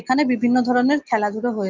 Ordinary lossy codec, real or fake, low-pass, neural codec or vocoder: Opus, 24 kbps; real; 7.2 kHz; none